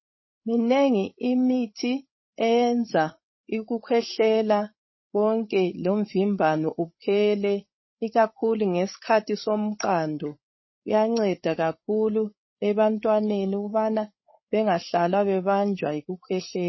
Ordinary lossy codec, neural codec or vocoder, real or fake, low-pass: MP3, 24 kbps; codec, 16 kHz, 8 kbps, FreqCodec, larger model; fake; 7.2 kHz